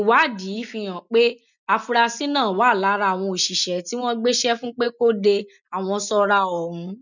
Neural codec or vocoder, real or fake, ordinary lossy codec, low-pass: none; real; none; 7.2 kHz